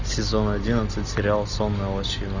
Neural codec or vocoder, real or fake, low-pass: none; real; 7.2 kHz